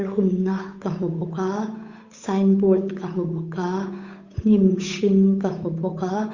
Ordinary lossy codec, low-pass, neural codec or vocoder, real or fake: Opus, 64 kbps; 7.2 kHz; codec, 16 kHz, 8 kbps, FunCodec, trained on Chinese and English, 25 frames a second; fake